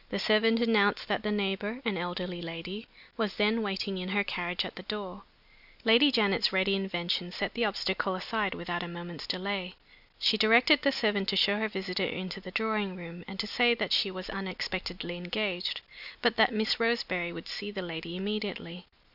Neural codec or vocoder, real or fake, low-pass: none; real; 5.4 kHz